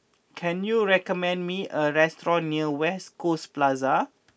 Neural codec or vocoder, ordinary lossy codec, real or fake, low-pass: none; none; real; none